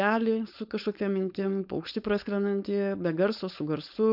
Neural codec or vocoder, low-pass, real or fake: codec, 16 kHz, 4.8 kbps, FACodec; 5.4 kHz; fake